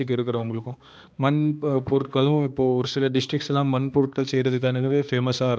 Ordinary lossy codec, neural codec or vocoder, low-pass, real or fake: none; codec, 16 kHz, 2 kbps, X-Codec, HuBERT features, trained on balanced general audio; none; fake